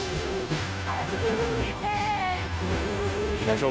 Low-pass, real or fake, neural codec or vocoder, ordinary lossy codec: none; fake; codec, 16 kHz, 0.5 kbps, FunCodec, trained on Chinese and English, 25 frames a second; none